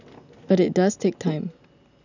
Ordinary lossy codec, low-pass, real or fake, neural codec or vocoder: none; 7.2 kHz; real; none